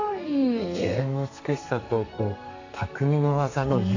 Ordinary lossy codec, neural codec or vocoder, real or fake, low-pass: AAC, 48 kbps; codec, 32 kHz, 1.9 kbps, SNAC; fake; 7.2 kHz